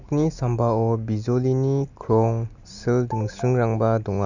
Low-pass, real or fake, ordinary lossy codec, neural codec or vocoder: 7.2 kHz; real; none; none